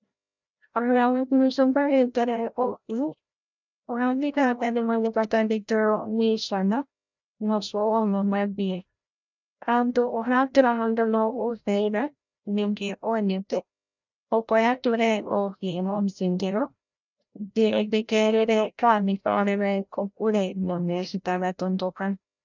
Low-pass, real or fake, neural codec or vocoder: 7.2 kHz; fake; codec, 16 kHz, 0.5 kbps, FreqCodec, larger model